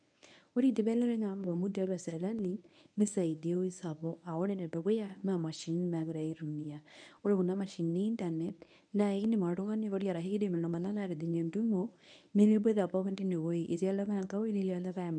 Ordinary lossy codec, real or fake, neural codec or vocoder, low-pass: none; fake; codec, 24 kHz, 0.9 kbps, WavTokenizer, medium speech release version 1; 9.9 kHz